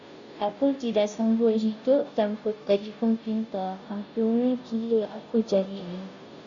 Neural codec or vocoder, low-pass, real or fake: codec, 16 kHz, 0.5 kbps, FunCodec, trained on Chinese and English, 25 frames a second; 7.2 kHz; fake